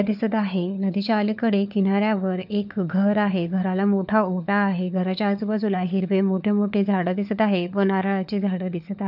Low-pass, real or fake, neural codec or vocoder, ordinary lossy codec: 5.4 kHz; fake; codec, 16 kHz, 4 kbps, FunCodec, trained on LibriTTS, 50 frames a second; none